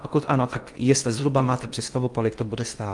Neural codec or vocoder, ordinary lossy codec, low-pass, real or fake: codec, 16 kHz in and 24 kHz out, 0.6 kbps, FocalCodec, streaming, 2048 codes; Opus, 32 kbps; 10.8 kHz; fake